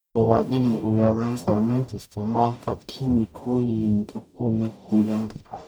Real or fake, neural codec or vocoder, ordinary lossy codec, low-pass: fake; codec, 44.1 kHz, 0.9 kbps, DAC; none; none